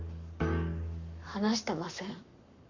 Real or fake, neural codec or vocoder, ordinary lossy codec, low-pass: fake; codec, 44.1 kHz, 7.8 kbps, Pupu-Codec; none; 7.2 kHz